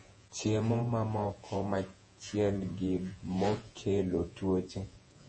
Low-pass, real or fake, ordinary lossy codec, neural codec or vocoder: 10.8 kHz; fake; MP3, 32 kbps; vocoder, 48 kHz, 128 mel bands, Vocos